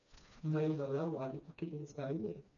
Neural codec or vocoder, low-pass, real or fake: codec, 16 kHz, 2 kbps, FreqCodec, smaller model; 7.2 kHz; fake